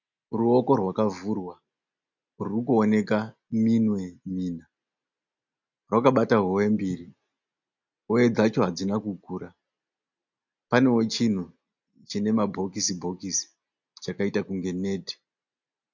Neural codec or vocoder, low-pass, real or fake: none; 7.2 kHz; real